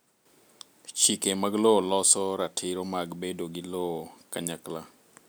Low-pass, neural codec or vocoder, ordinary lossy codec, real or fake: none; none; none; real